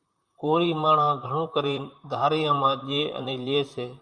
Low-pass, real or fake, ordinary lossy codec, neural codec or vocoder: 9.9 kHz; fake; Opus, 64 kbps; vocoder, 44.1 kHz, 128 mel bands, Pupu-Vocoder